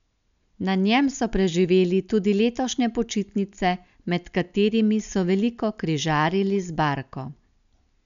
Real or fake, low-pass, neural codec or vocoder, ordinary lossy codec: real; 7.2 kHz; none; none